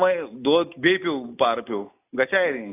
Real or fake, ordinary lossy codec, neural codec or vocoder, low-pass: real; AAC, 24 kbps; none; 3.6 kHz